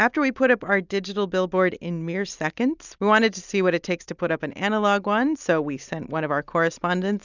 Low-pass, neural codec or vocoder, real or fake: 7.2 kHz; none; real